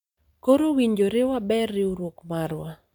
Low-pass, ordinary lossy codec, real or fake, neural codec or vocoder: 19.8 kHz; none; real; none